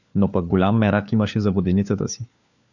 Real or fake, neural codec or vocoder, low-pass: fake; codec, 16 kHz, 4 kbps, FunCodec, trained on LibriTTS, 50 frames a second; 7.2 kHz